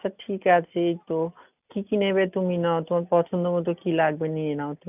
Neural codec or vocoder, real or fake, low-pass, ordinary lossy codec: none; real; 3.6 kHz; none